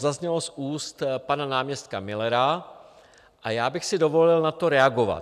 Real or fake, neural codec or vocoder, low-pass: real; none; 14.4 kHz